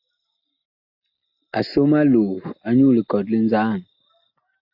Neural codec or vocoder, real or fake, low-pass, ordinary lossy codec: none; real; 5.4 kHz; AAC, 48 kbps